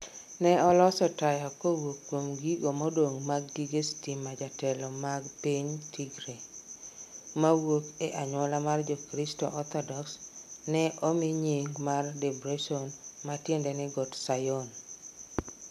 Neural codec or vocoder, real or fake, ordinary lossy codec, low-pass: none; real; MP3, 96 kbps; 14.4 kHz